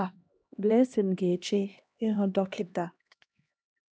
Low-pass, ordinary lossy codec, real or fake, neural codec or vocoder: none; none; fake; codec, 16 kHz, 1 kbps, X-Codec, HuBERT features, trained on LibriSpeech